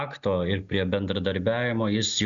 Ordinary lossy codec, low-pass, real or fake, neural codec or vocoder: Opus, 64 kbps; 7.2 kHz; real; none